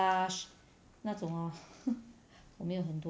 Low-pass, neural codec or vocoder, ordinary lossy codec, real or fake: none; none; none; real